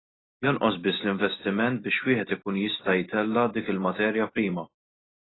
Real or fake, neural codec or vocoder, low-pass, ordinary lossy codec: real; none; 7.2 kHz; AAC, 16 kbps